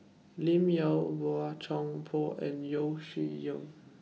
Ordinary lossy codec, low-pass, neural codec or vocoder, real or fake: none; none; none; real